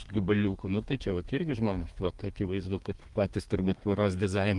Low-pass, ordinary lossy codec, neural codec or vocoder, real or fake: 10.8 kHz; Opus, 32 kbps; codec, 32 kHz, 1.9 kbps, SNAC; fake